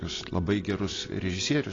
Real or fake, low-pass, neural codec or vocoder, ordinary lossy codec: real; 7.2 kHz; none; AAC, 32 kbps